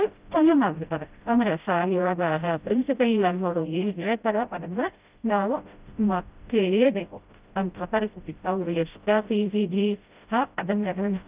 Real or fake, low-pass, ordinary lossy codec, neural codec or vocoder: fake; 3.6 kHz; Opus, 24 kbps; codec, 16 kHz, 0.5 kbps, FreqCodec, smaller model